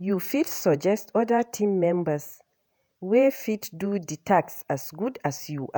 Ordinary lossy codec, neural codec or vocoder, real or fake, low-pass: none; vocoder, 48 kHz, 128 mel bands, Vocos; fake; none